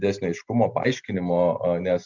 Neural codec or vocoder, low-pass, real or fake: none; 7.2 kHz; real